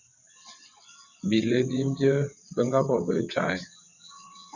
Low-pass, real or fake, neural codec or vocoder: 7.2 kHz; fake; vocoder, 22.05 kHz, 80 mel bands, WaveNeXt